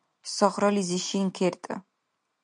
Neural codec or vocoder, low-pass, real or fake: none; 9.9 kHz; real